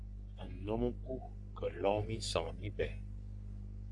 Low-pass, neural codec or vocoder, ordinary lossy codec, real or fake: 10.8 kHz; codec, 44.1 kHz, 3.4 kbps, Pupu-Codec; AAC, 64 kbps; fake